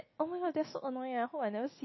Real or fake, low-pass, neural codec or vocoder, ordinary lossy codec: real; 7.2 kHz; none; MP3, 24 kbps